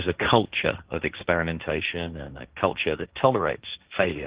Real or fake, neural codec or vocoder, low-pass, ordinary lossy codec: fake; codec, 16 kHz, 1.1 kbps, Voila-Tokenizer; 3.6 kHz; Opus, 16 kbps